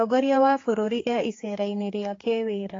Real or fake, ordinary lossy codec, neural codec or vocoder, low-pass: fake; AAC, 32 kbps; codec, 16 kHz, 4 kbps, X-Codec, HuBERT features, trained on general audio; 7.2 kHz